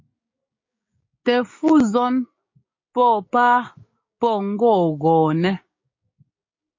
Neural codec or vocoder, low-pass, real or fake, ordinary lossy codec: codec, 16 kHz, 6 kbps, DAC; 7.2 kHz; fake; MP3, 32 kbps